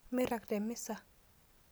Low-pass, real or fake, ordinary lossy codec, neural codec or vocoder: none; real; none; none